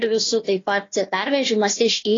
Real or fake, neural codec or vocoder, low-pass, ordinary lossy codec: fake; codec, 16 kHz, 1 kbps, FunCodec, trained on Chinese and English, 50 frames a second; 7.2 kHz; AAC, 32 kbps